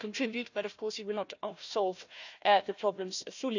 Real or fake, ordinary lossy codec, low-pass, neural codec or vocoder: fake; none; 7.2 kHz; codec, 16 kHz, 1 kbps, FunCodec, trained on Chinese and English, 50 frames a second